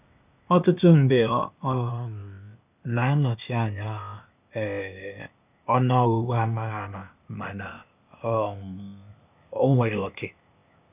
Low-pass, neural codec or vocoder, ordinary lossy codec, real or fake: 3.6 kHz; codec, 16 kHz, 0.8 kbps, ZipCodec; none; fake